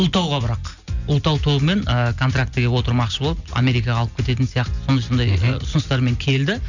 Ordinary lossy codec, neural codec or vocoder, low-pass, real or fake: none; none; 7.2 kHz; real